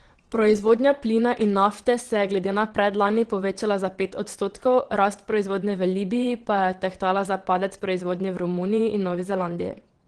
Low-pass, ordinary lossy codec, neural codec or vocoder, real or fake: 9.9 kHz; Opus, 16 kbps; vocoder, 22.05 kHz, 80 mel bands, WaveNeXt; fake